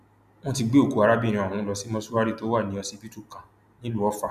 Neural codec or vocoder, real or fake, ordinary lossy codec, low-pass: none; real; none; 14.4 kHz